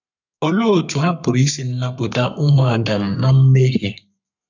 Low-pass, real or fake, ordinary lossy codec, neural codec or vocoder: 7.2 kHz; fake; none; codec, 32 kHz, 1.9 kbps, SNAC